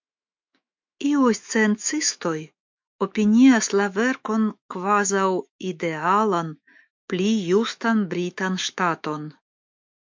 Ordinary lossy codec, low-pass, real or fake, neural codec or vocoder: MP3, 64 kbps; 7.2 kHz; fake; autoencoder, 48 kHz, 128 numbers a frame, DAC-VAE, trained on Japanese speech